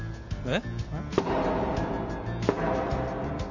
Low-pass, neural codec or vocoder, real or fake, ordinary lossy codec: 7.2 kHz; none; real; none